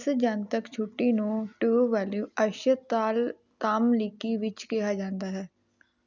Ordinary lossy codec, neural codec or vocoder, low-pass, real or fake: none; none; 7.2 kHz; real